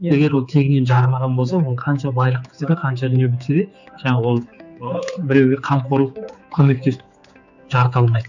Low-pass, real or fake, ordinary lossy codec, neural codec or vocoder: 7.2 kHz; fake; none; codec, 16 kHz, 4 kbps, X-Codec, HuBERT features, trained on general audio